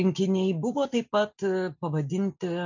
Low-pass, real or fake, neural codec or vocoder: 7.2 kHz; real; none